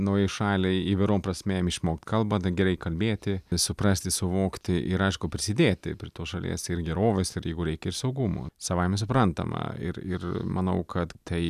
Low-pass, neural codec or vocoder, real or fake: 14.4 kHz; none; real